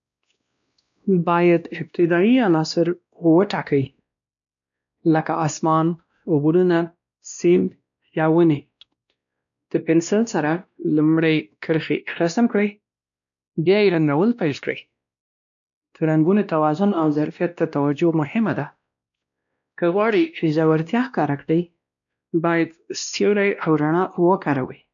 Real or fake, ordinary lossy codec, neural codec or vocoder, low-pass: fake; none; codec, 16 kHz, 1 kbps, X-Codec, WavLM features, trained on Multilingual LibriSpeech; 7.2 kHz